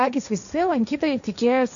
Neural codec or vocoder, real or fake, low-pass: codec, 16 kHz, 1.1 kbps, Voila-Tokenizer; fake; 7.2 kHz